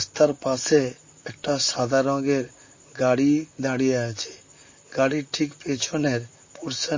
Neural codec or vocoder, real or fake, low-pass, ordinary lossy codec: vocoder, 44.1 kHz, 128 mel bands, Pupu-Vocoder; fake; 7.2 kHz; MP3, 32 kbps